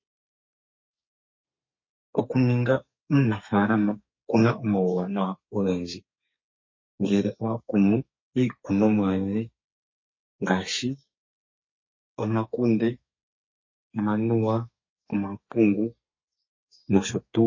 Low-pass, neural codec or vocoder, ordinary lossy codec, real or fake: 7.2 kHz; codec, 32 kHz, 1.9 kbps, SNAC; MP3, 32 kbps; fake